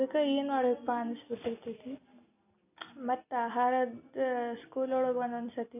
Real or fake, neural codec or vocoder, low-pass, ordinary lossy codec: real; none; 3.6 kHz; none